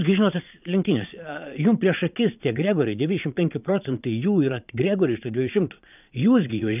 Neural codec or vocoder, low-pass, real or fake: vocoder, 22.05 kHz, 80 mel bands, Vocos; 3.6 kHz; fake